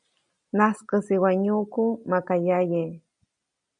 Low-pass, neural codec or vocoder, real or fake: 9.9 kHz; none; real